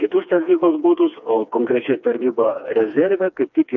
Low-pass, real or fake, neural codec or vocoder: 7.2 kHz; fake; codec, 16 kHz, 2 kbps, FreqCodec, smaller model